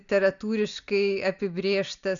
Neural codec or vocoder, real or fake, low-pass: none; real; 7.2 kHz